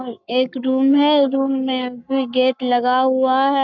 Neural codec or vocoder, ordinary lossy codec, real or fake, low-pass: codec, 44.1 kHz, 7.8 kbps, Pupu-Codec; none; fake; 7.2 kHz